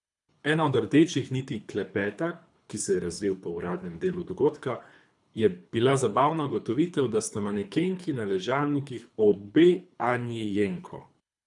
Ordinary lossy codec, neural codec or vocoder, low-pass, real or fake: none; codec, 24 kHz, 3 kbps, HILCodec; 10.8 kHz; fake